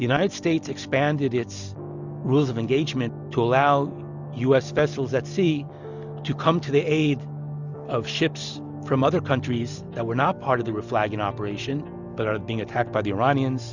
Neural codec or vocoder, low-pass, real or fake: none; 7.2 kHz; real